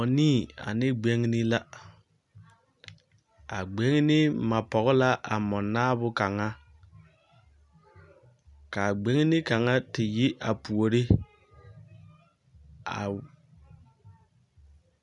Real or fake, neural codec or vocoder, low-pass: real; none; 10.8 kHz